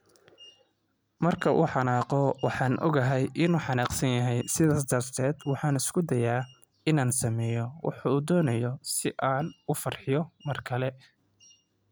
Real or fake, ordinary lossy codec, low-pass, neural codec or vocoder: real; none; none; none